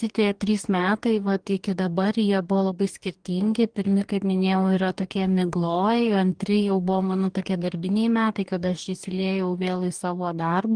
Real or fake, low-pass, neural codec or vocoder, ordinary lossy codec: fake; 9.9 kHz; codec, 44.1 kHz, 2.6 kbps, DAC; Opus, 24 kbps